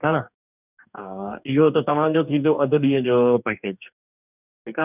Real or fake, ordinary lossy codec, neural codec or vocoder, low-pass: fake; none; codec, 44.1 kHz, 2.6 kbps, DAC; 3.6 kHz